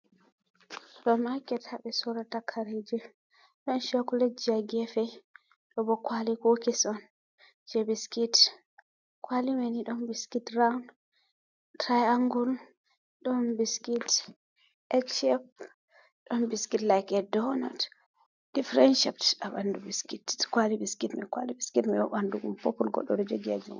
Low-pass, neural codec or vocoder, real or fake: 7.2 kHz; none; real